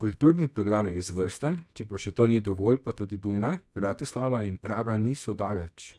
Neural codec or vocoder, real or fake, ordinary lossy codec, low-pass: codec, 24 kHz, 0.9 kbps, WavTokenizer, medium music audio release; fake; none; none